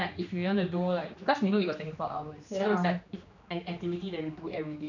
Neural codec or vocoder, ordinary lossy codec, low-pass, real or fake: codec, 16 kHz, 2 kbps, X-Codec, HuBERT features, trained on general audio; none; 7.2 kHz; fake